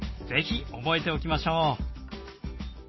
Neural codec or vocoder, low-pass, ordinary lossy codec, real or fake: none; 7.2 kHz; MP3, 24 kbps; real